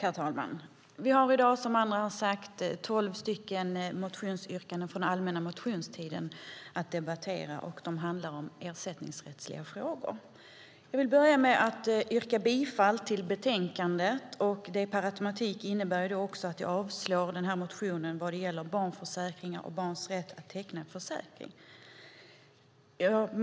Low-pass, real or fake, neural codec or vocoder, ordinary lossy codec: none; real; none; none